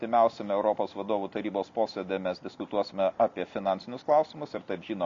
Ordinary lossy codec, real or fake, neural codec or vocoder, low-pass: MP3, 48 kbps; real; none; 7.2 kHz